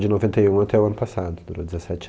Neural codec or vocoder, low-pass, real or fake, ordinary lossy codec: none; none; real; none